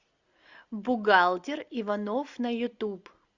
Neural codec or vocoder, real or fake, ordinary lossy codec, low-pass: none; real; Opus, 64 kbps; 7.2 kHz